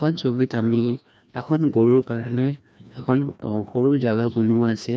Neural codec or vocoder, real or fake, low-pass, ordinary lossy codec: codec, 16 kHz, 1 kbps, FreqCodec, larger model; fake; none; none